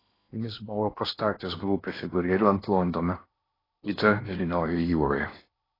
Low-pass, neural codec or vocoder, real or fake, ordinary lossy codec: 5.4 kHz; codec, 16 kHz in and 24 kHz out, 0.8 kbps, FocalCodec, streaming, 65536 codes; fake; AAC, 24 kbps